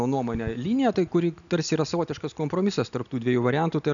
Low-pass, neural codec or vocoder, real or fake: 7.2 kHz; none; real